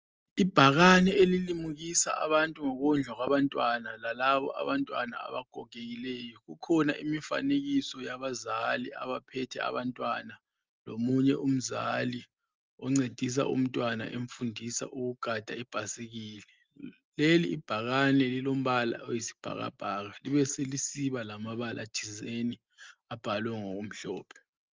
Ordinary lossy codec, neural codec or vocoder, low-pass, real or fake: Opus, 24 kbps; none; 7.2 kHz; real